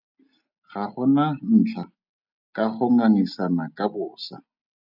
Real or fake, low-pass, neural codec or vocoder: real; 5.4 kHz; none